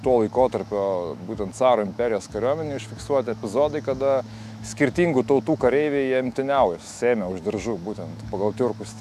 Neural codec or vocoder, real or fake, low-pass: autoencoder, 48 kHz, 128 numbers a frame, DAC-VAE, trained on Japanese speech; fake; 14.4 kHz